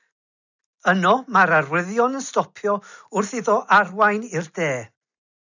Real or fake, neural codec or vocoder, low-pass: real; none; 7.2 kHz